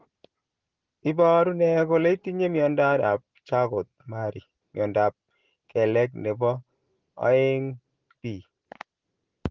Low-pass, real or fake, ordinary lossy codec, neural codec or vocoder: 7.2 kHz; real; Opus, 16 kbps; none